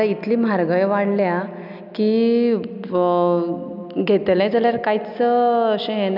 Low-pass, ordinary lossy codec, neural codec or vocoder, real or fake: 5.4 kHz; none; none; real